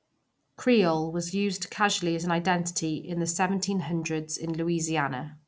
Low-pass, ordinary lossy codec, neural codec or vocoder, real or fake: none; none; none; real